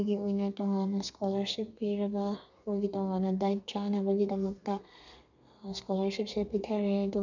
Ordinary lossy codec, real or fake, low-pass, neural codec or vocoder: none; fake; 7.2 kHz; codec, 32 kHz, 1.9 kbps, SNAC